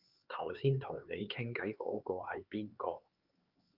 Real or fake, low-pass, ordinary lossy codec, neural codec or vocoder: fake; 5.4 kHz; Opus, 32 kbps; codec, 16 kHz, 4 kbps, X-Codec, HuBERT features, trained on LibriSpeech